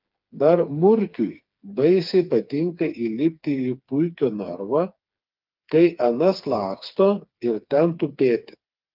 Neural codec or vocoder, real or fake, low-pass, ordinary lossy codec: codec, 16 kHz, 4 kbps, FreqCodec, smaller model; fake; 5.4 kHz; Opus, 24 kbps